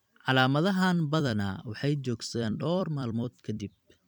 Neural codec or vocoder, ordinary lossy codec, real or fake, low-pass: vocoder, 44.1 kHz, 128 mel bands every 256 samples, BigVGAN v2; none; fake; 19.8 kHz